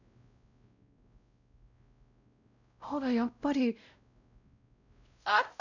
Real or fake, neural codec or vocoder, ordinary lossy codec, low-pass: fake; codec, 16 kHz, 0.5 kbps, X-Codec, WavLM features, trained on Multilingual LibriSpeech; none; 7.2 kHz